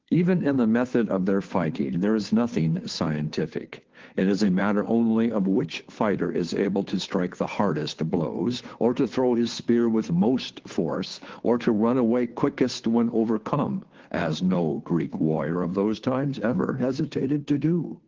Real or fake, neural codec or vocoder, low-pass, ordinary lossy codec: fake; codec, 16 kHz, 2 kbps, FunCodec, trained on Chinese and English, 25 frames a second; 7.2 kHz; Opus, 16 kbps